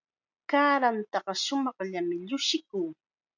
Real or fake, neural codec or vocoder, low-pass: real; none; 7.2 kHz